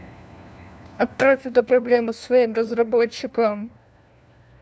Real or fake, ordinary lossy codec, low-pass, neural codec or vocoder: fake; none; none; codec, 16 kHz, 1 kbps, FunCodec, trained on LibriTTS, 50 frames a second